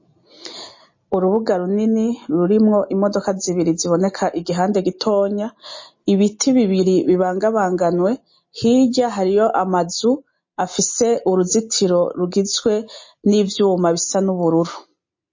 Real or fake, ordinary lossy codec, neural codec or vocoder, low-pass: real; MP3, 32 kbps; none; 7.2 kHz